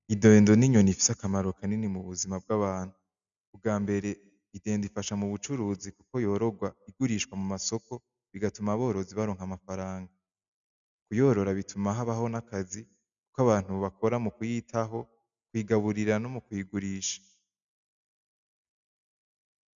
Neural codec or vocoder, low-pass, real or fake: none; 7.2 kHz; real